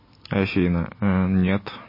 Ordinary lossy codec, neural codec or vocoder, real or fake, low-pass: MP3, 24 kbps; none; real; 5.4 kHz